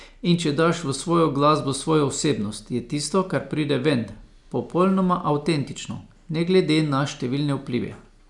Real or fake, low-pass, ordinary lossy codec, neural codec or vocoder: real; 10.8 kHz; none; none